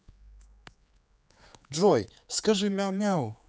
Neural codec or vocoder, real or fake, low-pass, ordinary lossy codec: codec, 16 kHz, 4 kbps, X-Codec, HuBERT features, trained on general audio; fake; none; none